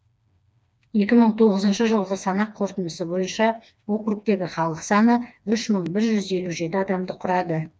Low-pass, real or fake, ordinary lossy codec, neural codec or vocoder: none; fake; none; codec, 16 kHz, 2 kbps, FreqCodec, smaller model